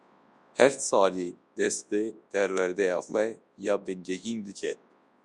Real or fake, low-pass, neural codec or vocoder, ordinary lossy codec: fake; 10.8 kHz; codec, 24 kHz, 0.9 kbps, WavTokenizer, large speech release; Opus, 64 kbps